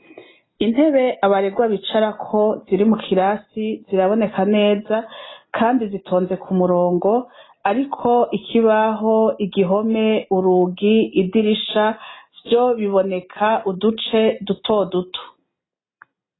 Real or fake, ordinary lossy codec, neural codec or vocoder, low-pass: real; AAC, 16 kbps; none; 7.2 kHz